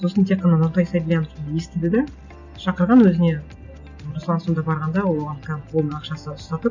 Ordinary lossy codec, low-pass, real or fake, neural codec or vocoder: none; 7.2 kHz; real; none